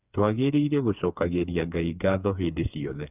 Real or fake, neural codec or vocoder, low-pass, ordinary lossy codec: fake; codec, 16 kHz, 4 kbps, FreqCodec, smaller model; 3.6 kHz; none